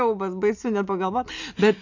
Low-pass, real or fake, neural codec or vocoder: 7.2 kHz; real; none